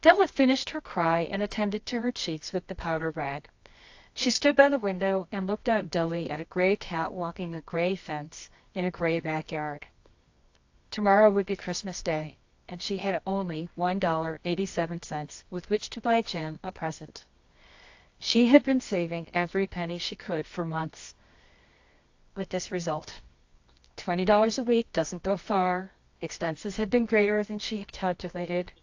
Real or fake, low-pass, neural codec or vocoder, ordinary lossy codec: fake; 7.2 kHz; codec, 24 kHz, 0.9 kbps, WavTokenizer, medium music audio release; AAC, 48 kbps